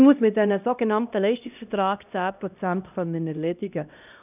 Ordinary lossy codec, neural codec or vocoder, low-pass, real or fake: none; codec, 16 kHz, 1 kbps, X-Codec, HuBERT features, trained on LibriSpeech; 3.6 kHz; fake